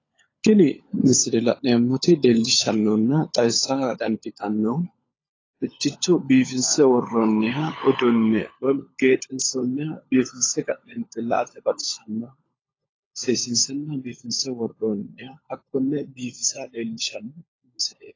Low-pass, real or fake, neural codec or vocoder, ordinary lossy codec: 7.2 kHz; fake; codec, 16 kHz, 16 kbps, FunCodec, trained on LibriTTS, 50 frames a second; AAC, 32 kbps